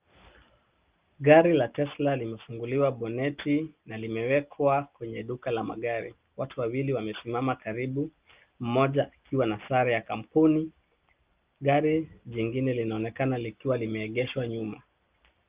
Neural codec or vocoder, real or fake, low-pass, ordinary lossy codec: none; real; 3.6 kHz; Opus, 24 kbps